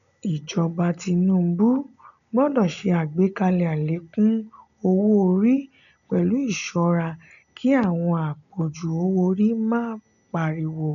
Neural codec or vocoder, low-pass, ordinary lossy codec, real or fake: none; 7.2 kHz; none; real